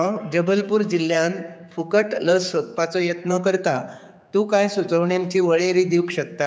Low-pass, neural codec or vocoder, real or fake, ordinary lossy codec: none; codec, 16 kHz, 4 kbps, X-Codec, HuBERT features, trained on general audio; fake; none